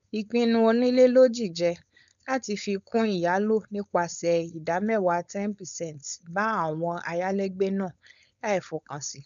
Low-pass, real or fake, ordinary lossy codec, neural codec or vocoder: 7.2 kHz; fake; none; codec, 16 kHz, 4.8 kbps, FACodec